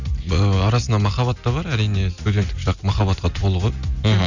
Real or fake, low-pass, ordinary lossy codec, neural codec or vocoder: real; 7.2 kHz; none; none